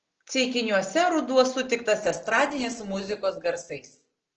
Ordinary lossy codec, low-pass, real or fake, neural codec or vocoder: Opus, 16 kbps; 7.2 kHz; real; none